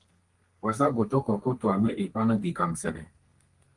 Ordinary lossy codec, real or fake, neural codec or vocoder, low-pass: Opus, 24 kbps; fake; codec, 32 kHz, 1.9 kbps, SNAC; 10.8 kHz